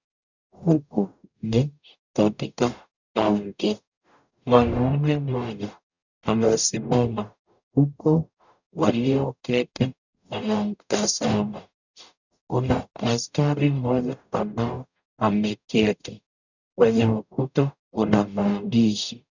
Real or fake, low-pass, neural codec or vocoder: fake; 7.2 kHz; codec, 44.1 kHz, 0.9 kbps, DAC